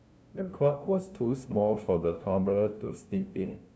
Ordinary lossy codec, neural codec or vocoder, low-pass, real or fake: none; codec, 16 kHz, 0.5 kbps, FunCodec, trained on LibriTTS, 25 frames a second; none; fake